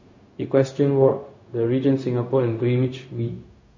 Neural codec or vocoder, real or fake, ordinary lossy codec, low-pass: codec, 16 kHz, 0.4 kbps, LongCat-Audio-Codec; fake; MP3, 32 kbps; 7.2 kHz